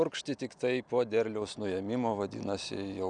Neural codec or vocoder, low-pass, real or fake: none; 9.9 kHz; real